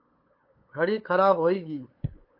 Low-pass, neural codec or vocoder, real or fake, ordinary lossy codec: 5.4 kHz; codec, 16 kHz, 8 kbps, FunCodec, trained on LibriTTS, 25 frames a second; fake; MP3, 32 kbps